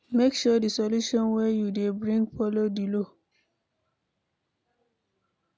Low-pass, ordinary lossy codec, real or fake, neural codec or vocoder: none; none; real; none